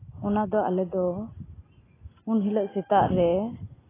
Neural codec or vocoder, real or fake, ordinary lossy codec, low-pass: none; real; AAC, 16 kbps; 3.6 kHz